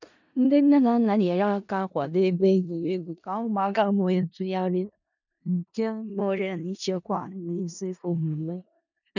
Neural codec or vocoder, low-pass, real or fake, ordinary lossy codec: codec, 16 kHz in and 24 kHz out, 0.4 kbps, LongCat-Audio-Codec, four codebook decoder; 7.2 kHz; fake; none